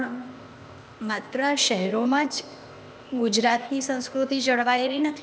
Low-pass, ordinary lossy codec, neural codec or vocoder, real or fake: none; none; codec, 16 kHz, 0.8 kbps, ZipCodec; fake